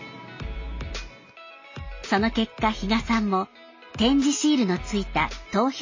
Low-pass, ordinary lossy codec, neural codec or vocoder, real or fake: 7.2 kHz; MP3, 32 kbps; none; real